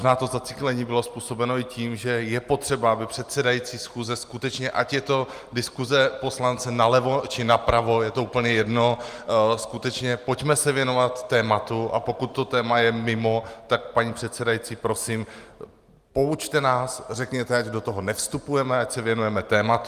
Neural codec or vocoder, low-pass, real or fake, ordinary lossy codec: none; 14.4 kHz; real; Opus, 32 kbps